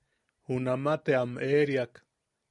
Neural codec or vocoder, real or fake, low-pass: none; real; 10.8 kHz